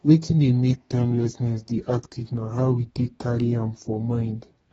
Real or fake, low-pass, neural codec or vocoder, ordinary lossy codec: fake; 14.4 kHz; codec, 32 kHz, 1.9 kbps, SNAC; AAC, 24 kbps